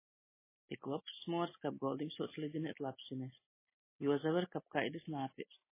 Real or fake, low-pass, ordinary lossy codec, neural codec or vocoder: real; 3.6 kHz; MP3, 16 kbps; none